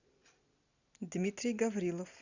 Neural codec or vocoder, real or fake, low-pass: none; real; 7.2 kHz